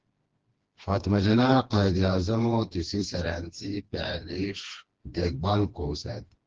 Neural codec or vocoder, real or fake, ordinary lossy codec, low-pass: codec, 16 kHz, 2 kbps, FreqCodec, smaller model; fake; Opus, 16 kbps; 7.2 kHz